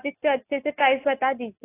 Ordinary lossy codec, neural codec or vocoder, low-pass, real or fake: AAC, 16 kbps; none; 3.6 kHz; real